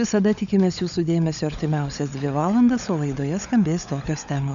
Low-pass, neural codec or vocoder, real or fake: 7.2 kHz; codec, 16 kHz, 16 kbps, FunCodec, trained on LibriTTS, 50 frames a second; fake